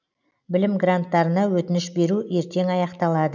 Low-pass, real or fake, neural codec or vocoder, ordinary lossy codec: 7.2 kHz; real; none; none